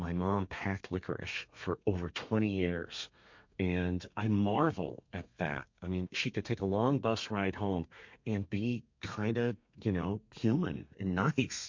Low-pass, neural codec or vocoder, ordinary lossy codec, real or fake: 7.2 kHz; codec, 32 kHz, 1.9 kbps, SNAC; MP3, 48 kbps; fake